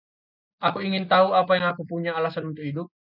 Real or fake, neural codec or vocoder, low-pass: real; none; 5.4 kHz